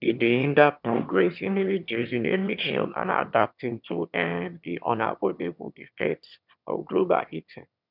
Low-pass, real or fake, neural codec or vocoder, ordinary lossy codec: 5.4 kHz; fake; autoencoder, 22.05 kHz, a latent of 192 numbers a frame, VITS, trained on one speaker; none